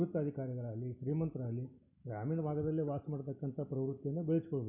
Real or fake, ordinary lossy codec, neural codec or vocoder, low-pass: real; none; none; 3.6 kHz